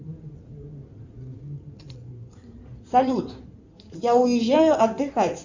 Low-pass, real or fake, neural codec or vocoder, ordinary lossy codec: 7.2 kHz; fake; codec, 16 kHz in and 24 kHz out, 2.2 kbps, FireRedTTS-2 codec; Opus, 64 kbps